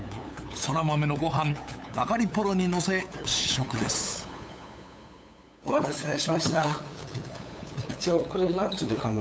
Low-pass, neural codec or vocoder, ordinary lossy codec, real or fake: none; codec, 16 kHz, 8 kbps, FunCodec, trained on LibriTTS, 25 frames a second; none; fake